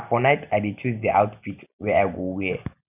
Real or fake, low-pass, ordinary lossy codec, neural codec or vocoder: real; 3.6 kHz; none; none